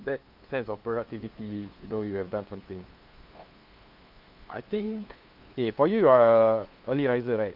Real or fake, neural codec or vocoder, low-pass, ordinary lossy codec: fake; codec, 16 kHz, 2 kbps, FunCodec, trained on LibriTTS, 25 frames a second; 5.4 kHz; Opus, 24 kbps